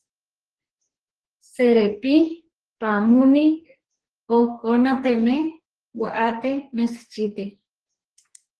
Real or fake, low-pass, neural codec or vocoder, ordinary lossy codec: fake; 10.8 kHz; codec, 44.1 kHz, 2.6 kbps, DAC; Opus, 16 kbps